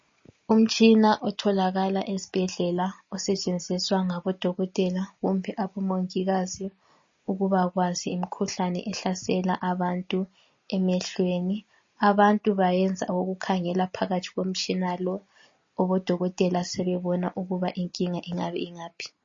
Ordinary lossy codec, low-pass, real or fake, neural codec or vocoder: MP3, 32 kbps; 7.2 kHz; real; none